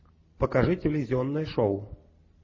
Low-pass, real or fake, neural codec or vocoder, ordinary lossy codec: 7.2 kHz; real; none; MP3, 32 kbps